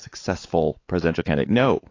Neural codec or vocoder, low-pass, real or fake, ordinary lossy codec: codec, 16 kHz, 4 kbps, X-Codec, WavLM features, trained on Multilingual LibriSpeech; 7.2 kHz; fake; AAC, 32 kbps